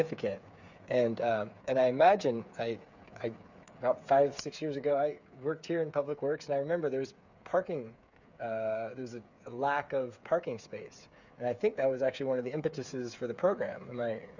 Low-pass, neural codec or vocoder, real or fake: 7.2 kHz; codec, 16 kHz, 8 kbps, FreqCodec, smaller model; fake